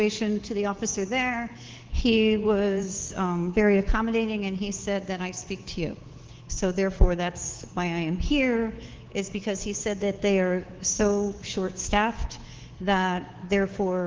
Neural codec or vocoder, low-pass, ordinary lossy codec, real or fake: codec, 24 kHz, 3.1 kbps, DualCodec; 7.2 kHz; Opus, 16 kbps; fake